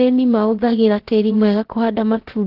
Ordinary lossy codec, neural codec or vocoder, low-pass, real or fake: Opus, 16 kbps; codec, 16 kHz, about 1 kbps, DyCAST, with the encoder's durations; 5.4 kHz; fake